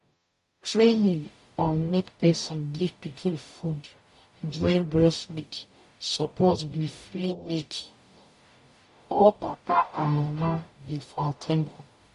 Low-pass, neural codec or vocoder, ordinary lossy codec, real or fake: 14.4 kHz; codec, 44.1 kHz, 0.9 kbps, DAC; MP3, 48 kbps; fake